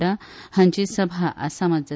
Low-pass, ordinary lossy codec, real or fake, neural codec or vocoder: none; none; real; none